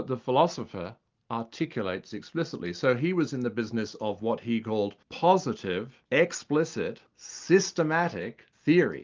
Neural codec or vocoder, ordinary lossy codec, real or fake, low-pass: none; Opus, 32 kbps; real; 7.2 kHz